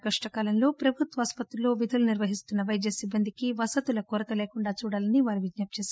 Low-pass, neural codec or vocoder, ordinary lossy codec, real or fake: none; none; none; real